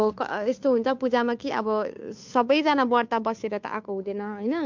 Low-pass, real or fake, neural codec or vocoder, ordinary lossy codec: 7.2 kHz; fake; codec, 16 kHz, 2 kbps, FunCodec, trained on Chinese and English, 25 frames a second; MP3, 64 kbps